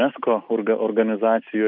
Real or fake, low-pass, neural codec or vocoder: real; 5.4 kHz; none